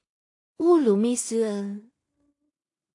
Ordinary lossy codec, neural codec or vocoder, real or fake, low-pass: AAC, 48 kbps; codec, 16 kHz in and 24 kHz out, 0.4 kbps, LongCat-Audio-Codec, two codebook decoder; fake; 10.8 kHz